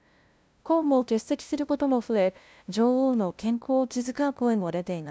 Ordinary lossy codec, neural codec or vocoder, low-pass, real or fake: none; codec, 16 kHz, 0.5 kbps, FunCodec, trained on LibriTTS, 25 frames a second; none; fake